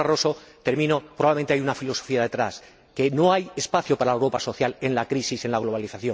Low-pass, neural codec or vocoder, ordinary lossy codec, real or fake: none; none; none; real